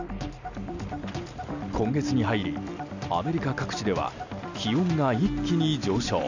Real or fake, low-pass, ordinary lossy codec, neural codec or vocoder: real; 7.2 kHz; none; none